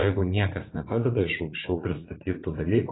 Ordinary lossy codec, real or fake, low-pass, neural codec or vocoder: AAC, 16 kbps; fake; 7.2 kHz; vocoder, 44.1 kHz, 80 mel bands, Vocos